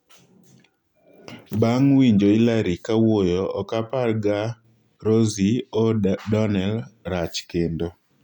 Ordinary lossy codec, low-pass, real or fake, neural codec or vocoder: none; 19.8 kHz; real; none